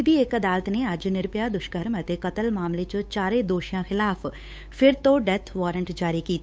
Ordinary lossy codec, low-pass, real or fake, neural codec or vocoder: none; none; fake; codec, 16 kHz, 8 kbps, FunCodec, trained on Chinese and English, 25 frames a second